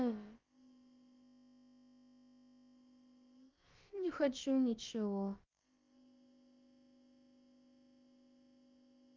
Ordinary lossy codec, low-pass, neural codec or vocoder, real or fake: Opus, 24 kbps; 7.2 kHz; codec, 16 kHz, about 1 kbps, DyCAST, with the encoder's durations; fake